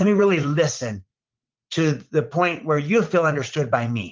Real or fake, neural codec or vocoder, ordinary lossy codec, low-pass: fake; vocoder, 44.1 kHz, 80 mel bands, Vocos; Opus, 32 kbps; 7.2 kHz